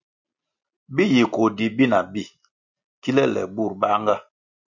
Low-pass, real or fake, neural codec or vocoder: 7.2 kHz; real; none